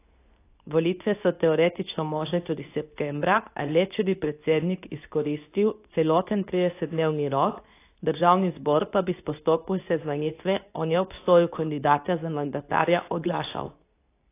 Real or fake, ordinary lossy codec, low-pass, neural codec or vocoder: fake; AAC, 24 kbps; 3.6 kHz; codec, 24 kHz, 0.9 kbps, WavTokenizer, medium speech release version 2